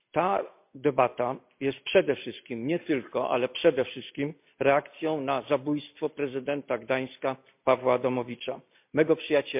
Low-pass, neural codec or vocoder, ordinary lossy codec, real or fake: 3.6 kHz; none; MP3, 32 kbps; real